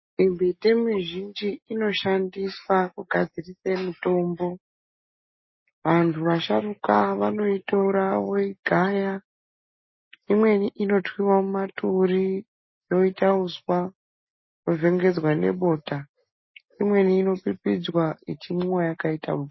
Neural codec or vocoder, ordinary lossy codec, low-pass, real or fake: none; MP3, 24 kbps; 7.2 kHz; real